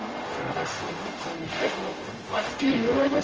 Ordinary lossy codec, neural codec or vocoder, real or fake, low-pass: Opus, 24 kbps; codec, 44.1 kHz, 0.9 kbps, DAC; fake; 7.2 kHz